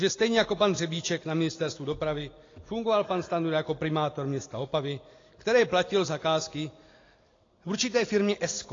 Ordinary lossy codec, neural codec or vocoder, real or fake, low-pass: AAC, 32 kbps; none; real; 7.2 kHz